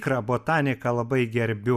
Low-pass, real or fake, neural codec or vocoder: 14.4 kHz; real; none